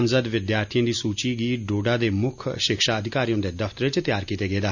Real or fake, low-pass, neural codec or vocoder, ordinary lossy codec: real; 7.2 kHz; none; none